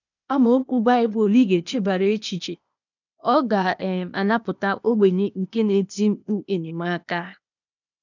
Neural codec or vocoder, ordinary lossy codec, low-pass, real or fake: codec, 16 kHz, 0.8 kbps, ZipCodec; none; 7.2 kHz; fake